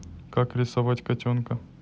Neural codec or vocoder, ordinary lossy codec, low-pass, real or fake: none; none; none; real